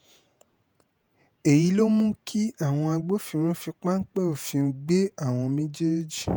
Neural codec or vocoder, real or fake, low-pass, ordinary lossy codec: vocoder, 48 kHz, 128 mel bands, Vocos; fake; none; none